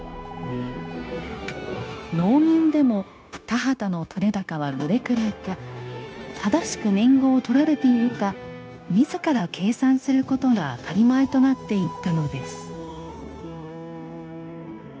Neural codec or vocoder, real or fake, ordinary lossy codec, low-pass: codec, 16 kHz, 0.9 kbps, LongCat-Audio-Codec; fake; none; none